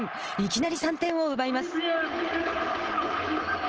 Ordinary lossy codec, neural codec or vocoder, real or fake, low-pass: Opus, 16 kbps; none; real; 7.2 kHz